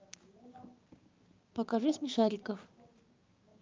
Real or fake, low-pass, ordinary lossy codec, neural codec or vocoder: fake; 7.2 kHz; Opus, 32 kbps; codec, 16 kHz, 6 kbps, DAC